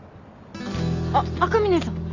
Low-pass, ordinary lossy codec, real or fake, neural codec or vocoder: 7.2 kHz; none; real; none